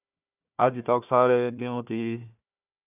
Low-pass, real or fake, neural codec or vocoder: 3.6 kHz; fake; codec, 16 kHz, 1 kbps, FunCodec, trained on Chinese and English, 50 frames a second